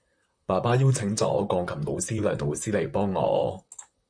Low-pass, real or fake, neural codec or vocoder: 9.9 kHz; fake; vocoder, 44.1 kHz, 128 mel bands, Pupu-Vocoder